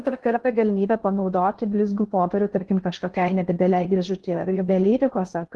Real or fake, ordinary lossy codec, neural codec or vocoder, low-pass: fake; Opus, 16 kbps; codec, 16 kHz in and 24 kHz out, 0.6 kbps, FocalCodec, streaming, 2048 codes; 10.8 kHz